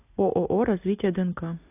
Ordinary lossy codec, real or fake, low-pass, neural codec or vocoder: AAC, 24 kbps; real; 3.6 kHz; none